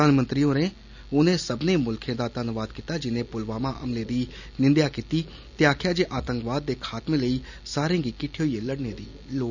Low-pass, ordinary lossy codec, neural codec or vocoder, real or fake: 7.2 kHz; none; none; real